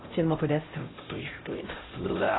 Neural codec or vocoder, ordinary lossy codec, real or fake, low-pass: codec, 16 kHz, 0.5 kbps, X-Codec, HuBERT features, trained on LibriSpeech; AAC, 16 kbps; fake; 7.2 kHz